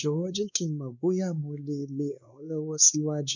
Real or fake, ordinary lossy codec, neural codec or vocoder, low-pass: fake; none; codec, 16 kHz, 2 kbps, X-Codec, WavLM features, trained on Multilingual LibriSpeech; 7.2 kHz